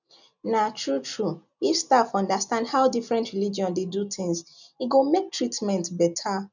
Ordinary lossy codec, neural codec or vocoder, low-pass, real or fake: none; none; 7.2 kHz; real